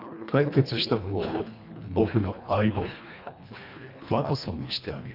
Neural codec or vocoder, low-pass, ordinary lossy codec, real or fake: codec, 24 kHz, 1.5 kbps, HILCodec; 5.4 kHz; none; fake